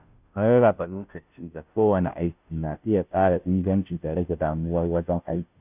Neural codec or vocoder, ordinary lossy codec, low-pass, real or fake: codec, 16 kHz, 0.5 kbps, FunCodec, trained on Chinese and English, 25 frames a second; none; 3.6 kHz; fake